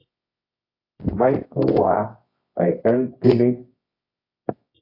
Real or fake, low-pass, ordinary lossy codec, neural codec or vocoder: fake; 5.4 kHz; AAC, 24 kbps; codec, 24 kHz, 0.9 kbps, WavTokenizer, medium music audio release